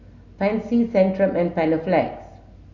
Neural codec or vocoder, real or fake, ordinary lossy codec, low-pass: none; real; none; 7.2 kHz